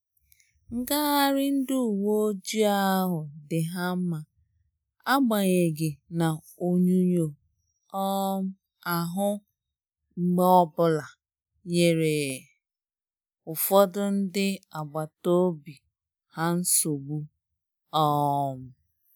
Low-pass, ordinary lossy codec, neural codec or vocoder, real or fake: none; none; none; real